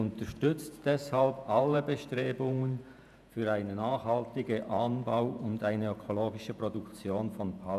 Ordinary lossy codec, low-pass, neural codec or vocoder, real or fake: none; 14.4 kHz; vocoder, 48 kHz, 128 mel bands, Vocos; fake